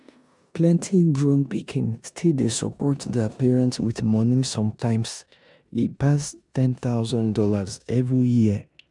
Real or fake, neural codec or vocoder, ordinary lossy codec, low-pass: fake; codec, 16 kHz in and 24 kHz out, 0.9 kbps, LongCat-Audio-Codec, four codebook decoder; none; 10.8 kHz